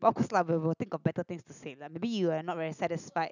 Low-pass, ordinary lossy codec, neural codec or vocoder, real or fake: 7.2 kHz; none; none; real